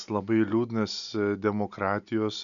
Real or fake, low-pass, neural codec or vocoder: real; 7.2 kHz; none